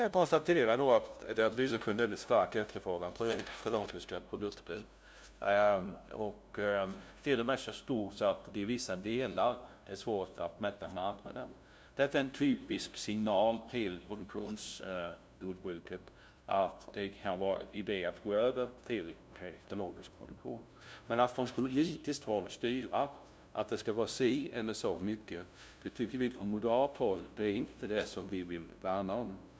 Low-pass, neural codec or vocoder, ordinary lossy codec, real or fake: none; codec, 16 kHz, 0.5 kbps, FunCodec, trained on LibriTTS, 25 frames a second; none; fake